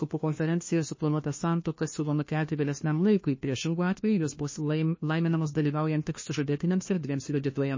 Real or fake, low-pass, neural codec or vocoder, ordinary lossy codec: fake; 7.2 kHz; codec, 16 kHz, 1 kbps, FunCodec, trained on LibriTTS, 50 frames a second; MP3, 32 kbps